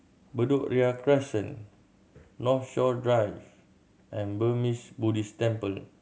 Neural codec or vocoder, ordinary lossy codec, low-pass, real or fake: none; none; none; real